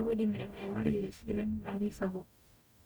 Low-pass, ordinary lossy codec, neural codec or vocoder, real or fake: none; none; codec, 44.1 kHz, 0.9 kbps, DAC; fake